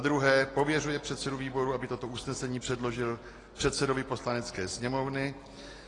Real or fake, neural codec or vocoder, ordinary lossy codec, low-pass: real; none; AAC, 32 kbps; 10.8 kHz